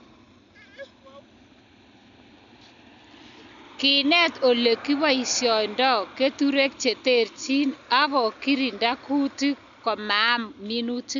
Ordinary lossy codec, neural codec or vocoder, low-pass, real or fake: none; none; 7.2 kHz; real